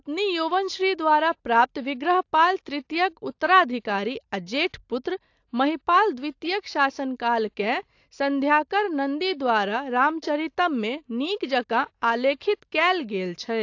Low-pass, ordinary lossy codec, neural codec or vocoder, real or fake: 7.2 kHz; AAC, 48 kbps; none; real